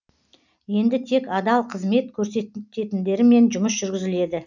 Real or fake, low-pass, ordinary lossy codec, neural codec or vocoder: real; 7.2 kHz; none; none